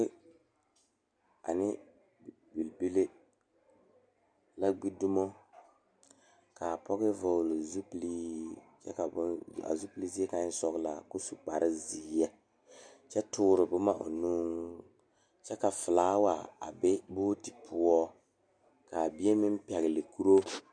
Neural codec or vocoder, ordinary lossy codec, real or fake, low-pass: none; MP3, 96 kbps; real; 9.9 kHz